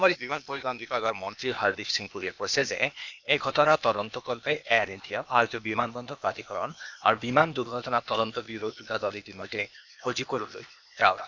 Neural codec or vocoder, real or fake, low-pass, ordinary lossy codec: codec, 16 kHz, 0.8 kbps, ZipCodec; fake; 7.2 kHz; none